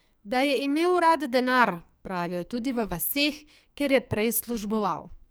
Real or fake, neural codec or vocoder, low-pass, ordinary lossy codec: fake; codec, 44.1 kHz, 2.6 kbps, SNAC; none; none